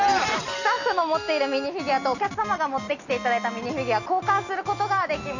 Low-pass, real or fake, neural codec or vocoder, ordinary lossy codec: 7.2 kHz; real; none; none